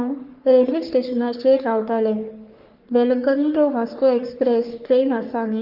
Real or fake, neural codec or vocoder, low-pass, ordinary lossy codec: fake; codec, 44.1 kHz, 3.4 kbps, Pupu-Codec; 5.4 kHz; Opus, 32 kbps